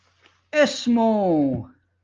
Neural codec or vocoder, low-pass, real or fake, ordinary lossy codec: none; 7.2 kHz; real; Opus, 24 kbps